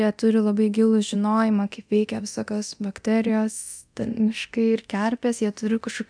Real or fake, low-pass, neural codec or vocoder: fake; 9.9 kHz; codec, 24 kHz, 0.9 kbps, DualCodec